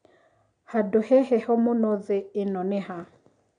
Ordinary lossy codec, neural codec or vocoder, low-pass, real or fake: none; none; 9.9 kHz; real